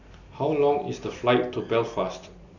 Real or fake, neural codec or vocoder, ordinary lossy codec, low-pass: real; none; none; 7.2 kHz